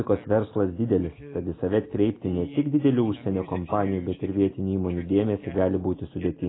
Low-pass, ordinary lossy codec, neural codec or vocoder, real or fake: 7.2 kHz; AAC, 16 kbps; none; real